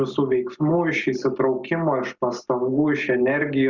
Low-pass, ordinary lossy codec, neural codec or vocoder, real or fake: 7.2 kHz; Opus, 64 kbps; none; real